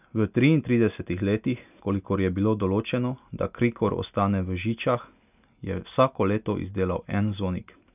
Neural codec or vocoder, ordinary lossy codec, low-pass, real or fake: none; none; 3.6 kHz; real